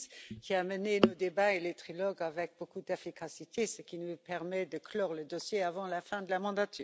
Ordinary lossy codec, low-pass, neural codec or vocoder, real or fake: none; none; none; real